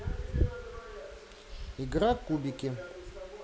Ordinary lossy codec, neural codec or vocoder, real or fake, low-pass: none; none; real; none